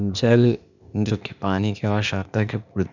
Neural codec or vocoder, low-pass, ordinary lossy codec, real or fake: codec, 16 kHz, 0.8 kbps, ZipCodec; 7.2 kHz; none; fake